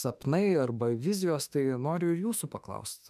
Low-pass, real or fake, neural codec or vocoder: 14.4 kHz; fake; autoencoder, 48 kHz, 32 numbers a frame, DAC-VAE, trained on Japanese speech